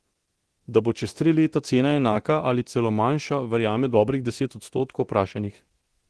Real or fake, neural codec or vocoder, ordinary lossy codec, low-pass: fake; codec, 24 kHz, 0.9 kbps, DualCodec; Opus, 16 kbps; 10.8 kHz